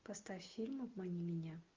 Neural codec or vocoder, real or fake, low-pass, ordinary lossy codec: none; real; 7.2 kHz; Opus, 32 kbps